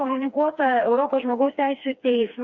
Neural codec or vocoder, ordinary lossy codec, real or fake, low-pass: codec, 16 kHz, 2 kbps, FreqCodec, smaller model; Opus, 64 kbps; fake; 7.2 kHz